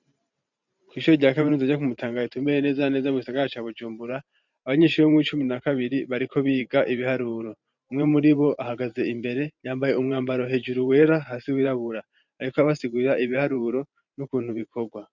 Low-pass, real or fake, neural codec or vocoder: 7.2 kHz; fake; vocoder, 44.1 kHz, 128 mel bands every 512 samples, BigVGAN v2